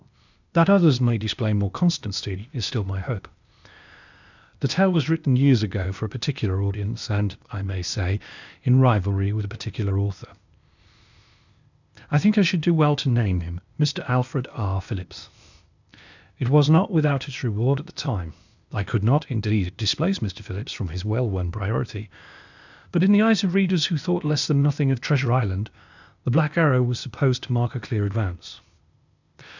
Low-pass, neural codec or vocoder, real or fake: 7.2 kHz; codec, 16 kHz, 0.8 kbps, ZipCodec; fake